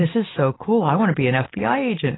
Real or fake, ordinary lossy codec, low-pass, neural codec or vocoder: real; AAC, 16 kbps; 7.2 kHz; none